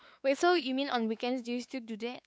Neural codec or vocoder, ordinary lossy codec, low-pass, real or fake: codec, 16 kHz, 4 kbps, X-Codec, WavLM features, trained on Multilingual LibriSpeech; none; none; fake